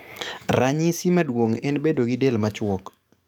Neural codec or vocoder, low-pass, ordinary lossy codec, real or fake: codec, 44.1 kHz, 7.8 kbps, DAC; none; none; fake